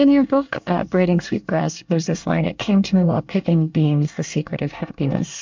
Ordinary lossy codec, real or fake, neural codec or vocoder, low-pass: MP3, 64 kbps; fake; codec, 24 kHz, 1 kbps, SNAC; 7.2 kHz